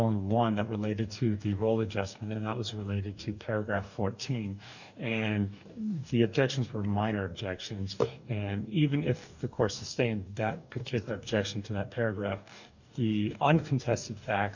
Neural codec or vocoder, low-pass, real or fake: codec, 44.1 kHz, 2.6 kbps, DAC; 7.2 kHz; fake